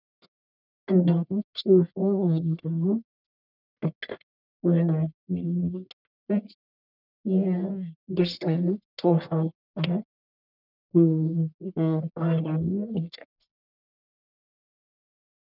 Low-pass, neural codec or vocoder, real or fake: 5.4 kHz; codec, 44.1 kHz, 1.7 kbps, Pupu-Codec; fake